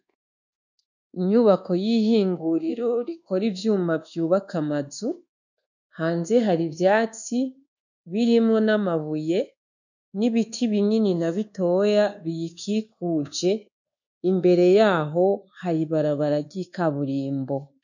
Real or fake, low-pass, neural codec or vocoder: fake; 7.2 kHz; codec, 24 kHz, 1.2 kbps, DualCodec